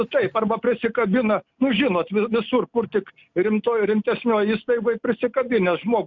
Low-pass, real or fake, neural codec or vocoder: 7.2 kHz; real; none